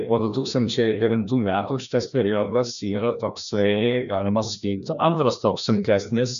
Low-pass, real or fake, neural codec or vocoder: 7.2 kHz; fake; codec, 16 kHz, 1 kbps, FreqCodec, larger model